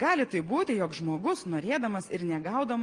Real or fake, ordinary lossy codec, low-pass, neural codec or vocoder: real; Opus, 24 kbps; 9.9 kHz; none